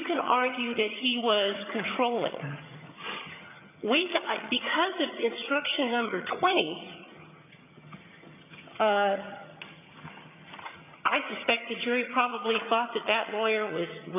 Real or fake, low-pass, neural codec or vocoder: fake; 3.6 kHz; vocoder, 22.05 kHz, 80 mel bands, HiFi-GAN